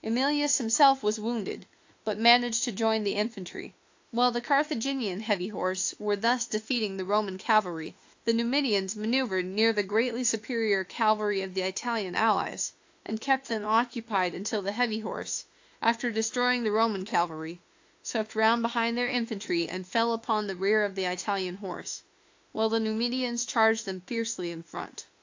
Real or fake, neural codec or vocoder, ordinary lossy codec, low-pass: fake; autoencoder, 48 kHz, 32 numbers a frame, DAC-VAE, trained on Japanese speech; AAC, 48 kbps; 7.2 kHz